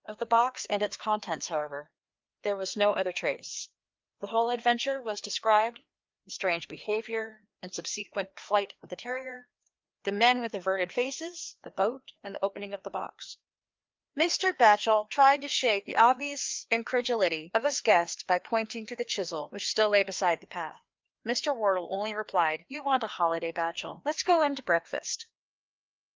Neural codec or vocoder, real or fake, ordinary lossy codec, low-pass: codec, 16 kHz, 2 kbps, FreqCodec, larger model; fake; Opus, 32 kbps; 7.2 kHz